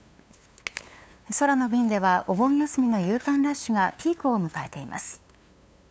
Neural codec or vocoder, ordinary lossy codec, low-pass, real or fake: codec, 16 kHz, 2 kbps, FunCodec, trained on LibriTTS, 25 frames a second; none; none; fake